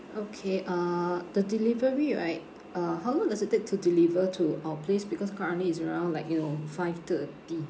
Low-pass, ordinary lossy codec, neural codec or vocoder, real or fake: none; none; none; real